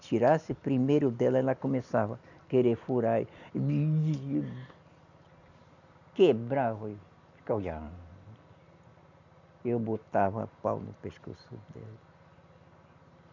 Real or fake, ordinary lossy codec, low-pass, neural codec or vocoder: real; none; 7.2 kHz; none